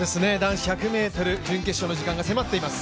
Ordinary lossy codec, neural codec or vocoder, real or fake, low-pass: none; none; real; none